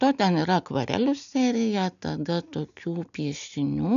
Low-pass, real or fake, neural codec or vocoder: 7.2 kHz; real; none